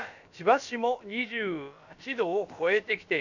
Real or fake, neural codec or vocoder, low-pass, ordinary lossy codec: fake; codec, 16 kHz, about 1 kbps, DyCAST, with the encoder's durations; 7.2 kHz; none